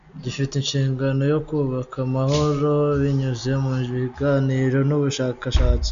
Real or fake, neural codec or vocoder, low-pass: real; none; 7.2 kHz